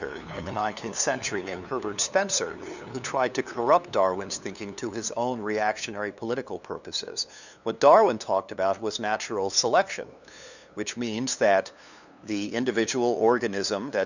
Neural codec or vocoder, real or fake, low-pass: codec, 16 kHz, 2 kbps, FunCodec, trained on LibriTTS, 25 frames a second; fake; 7.2 kHz